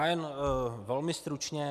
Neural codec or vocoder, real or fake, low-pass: none; real; 14.4 kHz